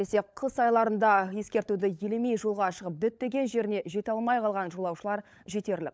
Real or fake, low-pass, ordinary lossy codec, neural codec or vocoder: fake; none; none; codec, 16 kHz, 16 kbps, FunCodec, trained on LibriTTS, 50 frames a second